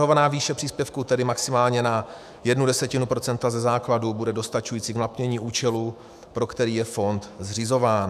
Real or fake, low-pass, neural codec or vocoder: fake; 14.4 kHz; autoencoder, 48 kHz, 128 numbers a frame, DAC-VAE, trained on Japanese speech